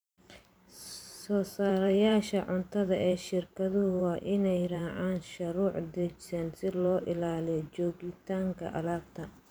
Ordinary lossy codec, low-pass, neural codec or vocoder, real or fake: none; none; vocoder, 44.1 kHz, 128 mel bands every 512 samples, BigVGAN v2; fake